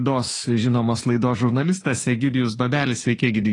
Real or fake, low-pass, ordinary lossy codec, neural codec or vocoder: fake; 10.8 kHz; AAC, 32 kbps; autoencoder, 48 kHz, 32 numbers a frame, DAC-VAE, trained on Japanese speech